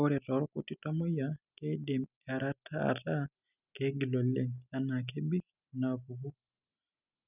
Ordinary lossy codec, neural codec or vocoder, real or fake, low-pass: none; none; real; 3.6 kHz